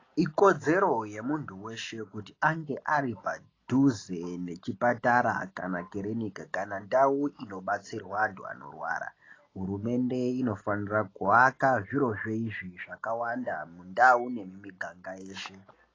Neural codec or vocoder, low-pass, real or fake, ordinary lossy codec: none; 7.2 kHz; real; AAC, 32 kbps